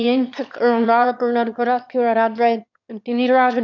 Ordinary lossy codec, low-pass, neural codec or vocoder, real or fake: none; 7.2 kHz; autoencoder, 22.05 kHz, a latent of 192 numbers a frame, VITS, trained on one speaker; fake